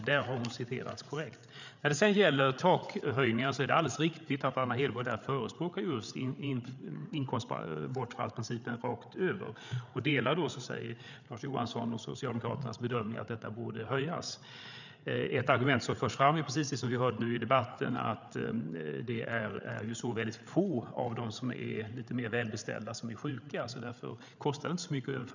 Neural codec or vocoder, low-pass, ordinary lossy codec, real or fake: codec, 16 kHz, 8 kbps, FreqCodec, larger model; 7.2 kHz; none; fake